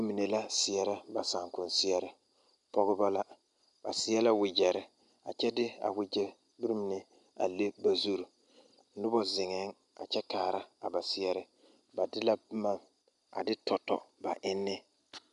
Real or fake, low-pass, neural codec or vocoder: real; 10.8 kHz; none